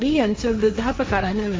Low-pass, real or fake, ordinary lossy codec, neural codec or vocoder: none; fake; none; codec, 16 kHz, 1.1 kbps, Voila-Tokenizer